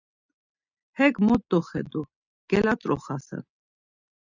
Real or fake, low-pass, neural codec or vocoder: real; 7.2 kHz; none